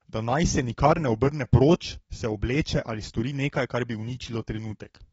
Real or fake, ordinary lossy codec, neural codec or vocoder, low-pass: fake; AAC, 24 kbps; codec, 44.1 kHz, 7.8 kbps, DAC; 19.8 kHz